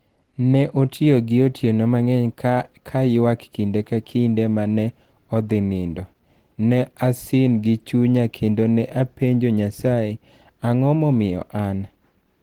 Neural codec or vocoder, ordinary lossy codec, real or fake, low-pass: none; Opus, 16 kbps; real; 19.8 kHz